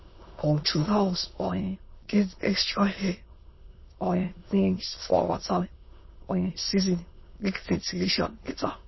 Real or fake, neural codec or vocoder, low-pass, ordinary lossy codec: fake; autoencoder, 22.05 kHz, a latent of 192 numbers a frame, VITS, trained on many speakers; 7.2 kHz; MP3, 24 kbps